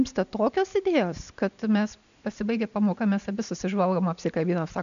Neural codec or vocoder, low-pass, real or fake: none; 7.2 kHz; real